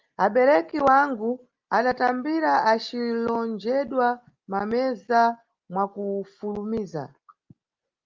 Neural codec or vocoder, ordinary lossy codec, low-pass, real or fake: none; Opus, 32 kbps; 7.2 kHz; real